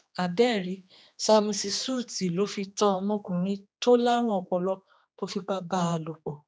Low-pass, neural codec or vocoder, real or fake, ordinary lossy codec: none; codec, 16 kHz, 2 kbps, X-Codec, HuBERT features, trained on general audio; fake; none